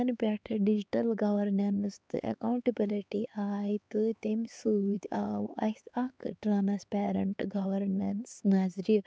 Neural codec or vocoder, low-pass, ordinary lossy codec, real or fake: codec, 16 kHz, 4 kbps, X-Codec, HuBERT features, trained on LibriSpeech; none; none; fake